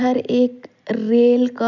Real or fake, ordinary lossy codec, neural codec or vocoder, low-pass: real; none; none; 7.2 kHz